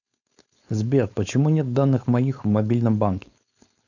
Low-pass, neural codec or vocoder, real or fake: 7.2 kHz; codec, 16 kHz, 4.8 kbps, FACodec; fake